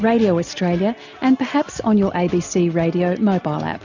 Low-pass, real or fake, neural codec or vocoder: 7.2 kHz; real; none